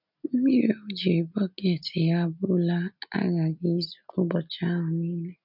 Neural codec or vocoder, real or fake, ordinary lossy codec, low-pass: none; real; none; 5.4 kHz